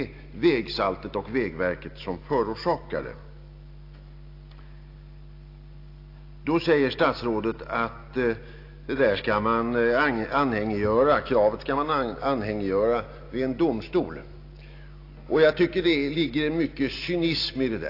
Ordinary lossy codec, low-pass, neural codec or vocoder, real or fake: AAC, 32 kbps; 5.4 kHz; none; real